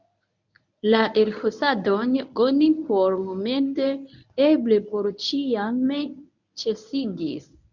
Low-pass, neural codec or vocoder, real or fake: 7.2 kHz; codec, 24 kHz, 0.9 kbps, WavTokenizer, medium speech release version 1; fake